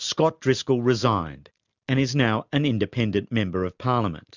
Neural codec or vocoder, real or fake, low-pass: none; real; 7.2 kHz